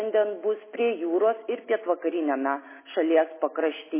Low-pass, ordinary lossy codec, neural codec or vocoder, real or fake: 3.6 kHz; MP3, 16 kbps; none; real